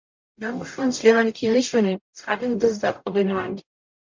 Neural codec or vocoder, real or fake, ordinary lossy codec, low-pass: codec, 44.1 kHz, 0.9 kbps, DAC; fake; MP3, 48 kbps; 7.2 kHz